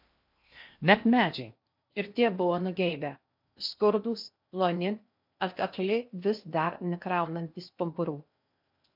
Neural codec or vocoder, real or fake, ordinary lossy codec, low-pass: codec, 16 kHz in and 24 kHz out, 0.8 kbps, FocalCodec, streaming, 65536 codes; fake; MP3, 48 kbps; 5.4 kHz